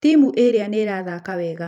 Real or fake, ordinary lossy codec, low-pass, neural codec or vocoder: fake; none; 19.8 kHz; vocoder, 44.1 kHz, 128 mel bands every 512 samples, BigVGAN v2